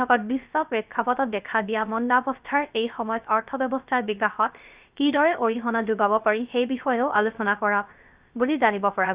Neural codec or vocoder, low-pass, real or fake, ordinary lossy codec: codec, 16 kHz, 0.3 kbps, FocalCodec; 3.6 kHz; fake; Opus, 64 kbps